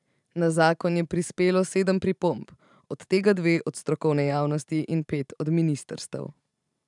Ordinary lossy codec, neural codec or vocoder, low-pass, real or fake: none; vocoder, 44.1 kHz, 128 mel bands every 512 samples, BigVGAN v2; 10.8 kHz; fake